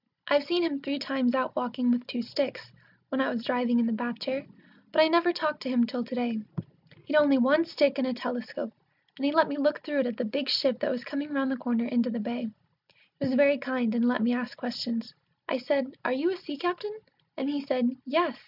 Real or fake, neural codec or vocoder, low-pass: real; none; 5.4 kHz